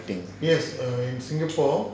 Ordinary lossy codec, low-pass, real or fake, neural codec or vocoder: none; none; real; none